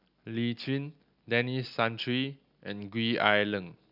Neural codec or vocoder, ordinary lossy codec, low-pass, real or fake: none; none; 5.4 kHz; real